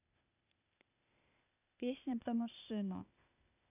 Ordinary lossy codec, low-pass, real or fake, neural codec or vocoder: none; 3.6 kHz; fake; codec, 16 kHz, 0.8 kbps, ZipCodec